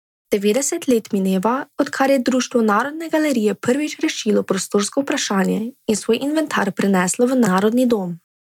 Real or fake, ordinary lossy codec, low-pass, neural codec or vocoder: real; none; 19.8 kHz; none